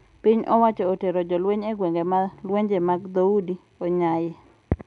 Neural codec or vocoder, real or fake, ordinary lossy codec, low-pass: none; real; none; 10.8 kHz